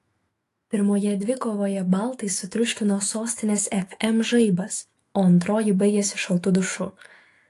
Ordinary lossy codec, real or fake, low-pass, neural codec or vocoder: AAC, 48 kbps; fake; 14.4 kHz; autoencoder, 48 kHz, 128 numbers a frame, DAC-VAE, trained on Japanese speech